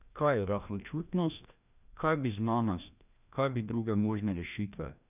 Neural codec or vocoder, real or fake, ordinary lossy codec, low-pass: codec, 16 kHz, 1 kbps, FreqCodec, larger model; fake; none; 3.6 kHz